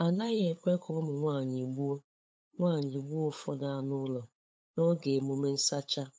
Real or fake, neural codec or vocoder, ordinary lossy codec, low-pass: fake; codec, 16 kHz, 8 kbps, FunCodec, trained on LibriTTS, 25 frames a second; none; none